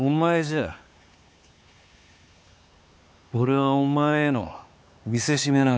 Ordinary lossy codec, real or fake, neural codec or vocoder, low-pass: none; fake; codec, 16 kHz, 4 kbps, X-Codec, HuBERT features, trained on LibriSpeech; none